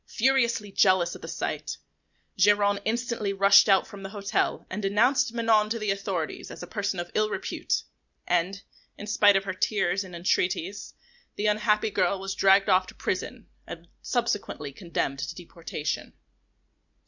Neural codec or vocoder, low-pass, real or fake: none; 7.2 kHz; real